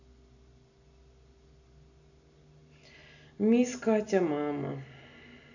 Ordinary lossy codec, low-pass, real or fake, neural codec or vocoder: none; 7.2 kHz; real; none